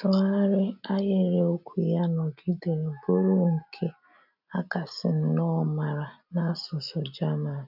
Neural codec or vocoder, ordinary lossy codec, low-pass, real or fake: none; none; 5.4 kHz; real